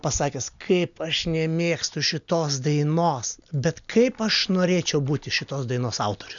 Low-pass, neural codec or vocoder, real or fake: 7.2 kHz; none; real